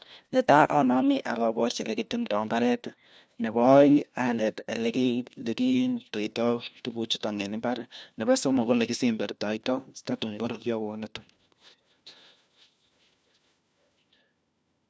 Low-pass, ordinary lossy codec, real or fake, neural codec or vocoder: none; none; fake; codec, 16 kHz, 1 kbps, FunCodec, trained on LibriTTS, 50 frames a second